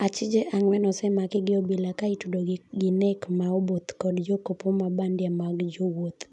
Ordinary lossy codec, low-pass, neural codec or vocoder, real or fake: none; 10.8 kHz; none; real